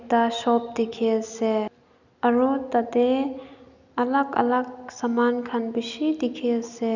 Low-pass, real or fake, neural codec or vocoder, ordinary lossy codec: 7.2 kHz; real; none; none